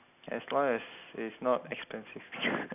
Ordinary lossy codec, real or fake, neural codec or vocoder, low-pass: none; real; none; 3.6 kHz